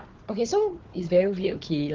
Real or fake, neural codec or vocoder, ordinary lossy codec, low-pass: fake; codec, 16 kHz, 4 kbps, FunCodec, trained on Chinese and English, 50 frames a second; Opus, 16 kbps; 7.2 kHz